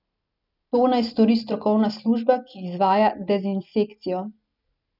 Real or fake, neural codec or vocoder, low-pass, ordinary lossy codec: real; none; 5.4 kHz; none